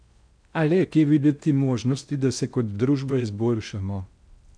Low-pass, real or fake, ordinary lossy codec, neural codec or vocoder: 9.9 kHz; fake; none; codec, 16 kHz in and 24 kHz out, 0.6 kbps, FocalCodec, streaming, 4096 codes